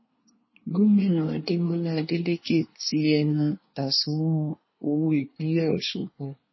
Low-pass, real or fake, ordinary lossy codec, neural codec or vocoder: 7.2 kHz; fake; MP3, 24 kbps; codec, 24 kHz, 1 kbps, SNAC